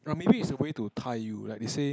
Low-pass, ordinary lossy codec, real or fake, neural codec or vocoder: none; none; real; none